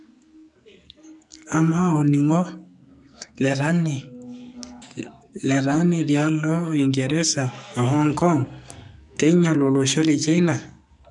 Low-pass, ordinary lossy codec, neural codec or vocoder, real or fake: 10.8 kHz; none; codec, 44.1 kHz, 2.6 kbps, SNAC; fake